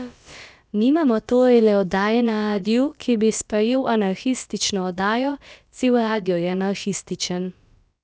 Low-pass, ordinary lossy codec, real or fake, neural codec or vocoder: none; none; fake; codec, 16 kHz, about 1 kbps, DyCAST, with the encoder's durations